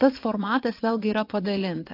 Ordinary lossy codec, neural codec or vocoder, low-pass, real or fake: AAC, 32 kbps; vocoder, 22.05 kHz, 80 mel bands, WaveNeXt; 5.4 kHz; fake